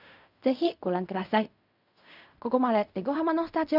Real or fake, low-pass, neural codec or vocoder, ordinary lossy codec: fake; 5.4 kHz; codec, 16 kHz in and 24 kHz out, 0.4 kbps, LongCat-Audio-Codec, fine tuned four codebook decoder; none